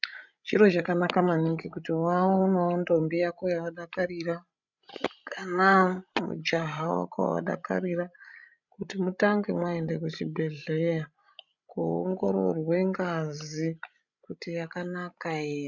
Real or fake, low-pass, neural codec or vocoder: fake; 7.2 kHz; codec, 16 kHz, 16 kbps, FreqCodec, larger model